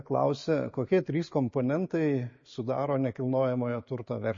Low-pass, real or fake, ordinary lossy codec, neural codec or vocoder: 7.2 kHz; real; MP3, 32 kbps; none